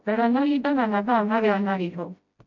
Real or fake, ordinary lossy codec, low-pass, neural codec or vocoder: fake; MP3, 48 kbps; 7.2 kHz; codec, 16 kHz, 0.5 kbps, FreqCodec, smaller model